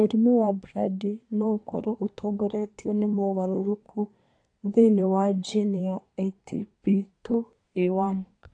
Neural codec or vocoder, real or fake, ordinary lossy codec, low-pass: codec, 24 kHz, 1 kbps, SNAC; fake; AAC, 48 kbps; 9.9 kHz